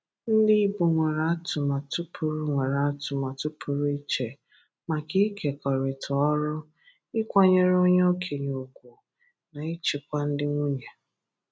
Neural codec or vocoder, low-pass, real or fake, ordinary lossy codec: none; none; real; none